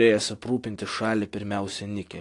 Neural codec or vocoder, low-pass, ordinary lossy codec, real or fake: none; 10.8 kHz; AAC, 48 kbps; real